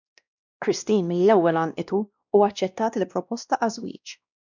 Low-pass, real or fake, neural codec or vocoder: 7.2 kHz; fake; codec, 16 kHz, 1 kbps, X-Codec, WavLM features, trained on Multilingual LibriSpeech